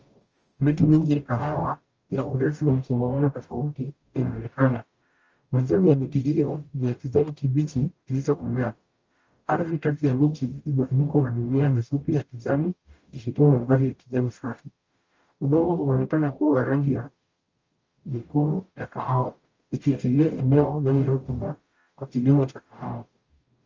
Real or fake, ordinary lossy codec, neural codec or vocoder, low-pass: fake; Opus, 24 kbps; codec, 44.1 kHz, 0.9 kbps, DAC; 7.2 kHz